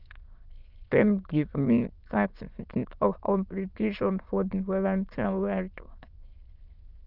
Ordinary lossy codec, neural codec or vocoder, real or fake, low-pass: Opus, 32 kbps; autoencoder, 22.05 kHz, a latent of 192 numbers a frame, VITS, trained on many speakers; fake; 5.4 kHz